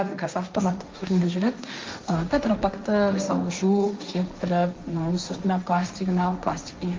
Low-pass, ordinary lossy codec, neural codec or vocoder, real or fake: 7.2 kHz; Opus, 24 kbps; codec, 16 kHz, 1.1 kbps, Voila-Tokenizer; fake